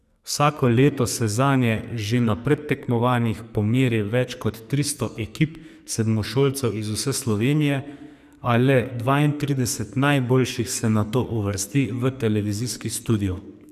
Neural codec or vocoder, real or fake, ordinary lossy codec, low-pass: codec, 44.1 kHz, 2.6 kbps, SNAC; fake; none; 14.4 kHz